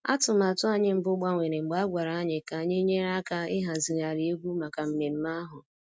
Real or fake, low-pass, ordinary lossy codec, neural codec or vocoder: real; none; none; none